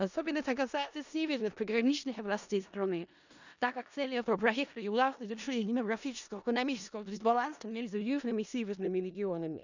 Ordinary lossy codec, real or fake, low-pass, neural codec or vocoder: none; fake; 7.2 kHz; codec, 16 kHz in and 24 kHz out, 0.4 kbps, LongCat-Audio-Codec, four codebook decoder